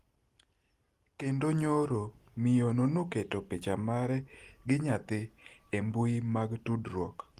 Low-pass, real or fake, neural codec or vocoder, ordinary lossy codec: 14.4 kHz; real; none; Opus, 24 kbps